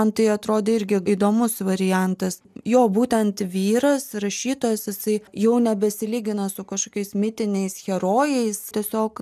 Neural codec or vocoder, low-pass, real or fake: none; 14.4 kHz; real